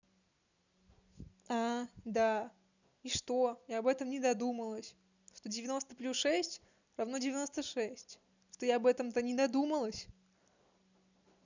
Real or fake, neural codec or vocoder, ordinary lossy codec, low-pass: real; none; none; 7.2 kHz